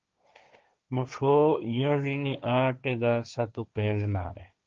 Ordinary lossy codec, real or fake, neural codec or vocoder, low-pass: Opus, 24 kbps; fake; codec, 16 kHz, 1.1 kbps, Voila-Tokenizer; 7.2 kHz